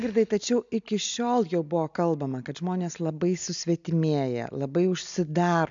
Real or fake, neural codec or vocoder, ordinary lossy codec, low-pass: real; none; MP3, 64 kbps; 7.2 kHz